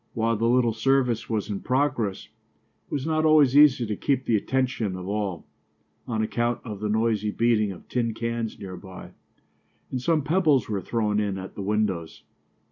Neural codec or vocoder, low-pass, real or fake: none; 7.2 kHz; real